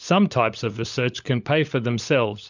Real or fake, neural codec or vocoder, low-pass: fake; codec, 16 kHz, 16 kbps, FunCodec, trained on LibriTTS, 50 frames a second; 7.2 kHz